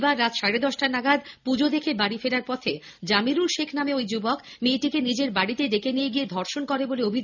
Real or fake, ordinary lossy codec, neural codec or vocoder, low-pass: real; none; none; 7.2 kHz